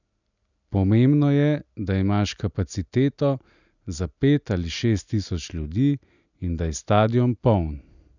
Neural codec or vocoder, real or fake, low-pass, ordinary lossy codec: none; real; 7.2 kHz; none